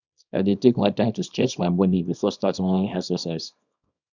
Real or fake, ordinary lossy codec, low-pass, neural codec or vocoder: fake; none; 7.2 kHz; codec, 24 kHz, 0.9 kbps, WavTokenizer, small release